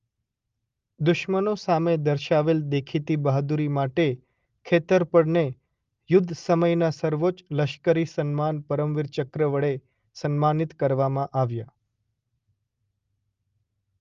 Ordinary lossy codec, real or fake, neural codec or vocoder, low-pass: Opus, 32 kbps; real; none; 7.2 kHz